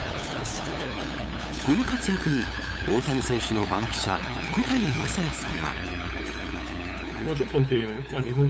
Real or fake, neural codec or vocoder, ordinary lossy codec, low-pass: fake; codec, 16 kHz, 8 kbps, FunCodec, trained on LibriTTS, 25 frames a second; none; none